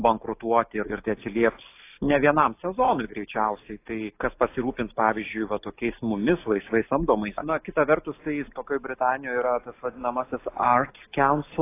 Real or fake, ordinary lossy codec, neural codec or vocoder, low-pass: real; AAC, 24 kbps; none; 3.6 kHz